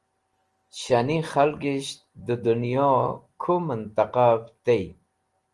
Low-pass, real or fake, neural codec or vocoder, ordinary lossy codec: 10.8 kHz; real; none; Opus, 24 kbps